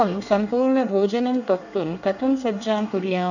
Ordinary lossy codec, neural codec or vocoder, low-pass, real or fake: none; codec, 24 kHz, 1 kbps, SNAC; 7.2 kHz; fake